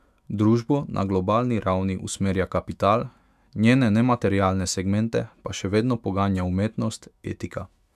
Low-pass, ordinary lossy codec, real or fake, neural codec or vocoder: 14.4 kHz; none; fake; autoencoder, 48 kHz, 128 numbers a frame, DAC-VAE, trained on Japanese speech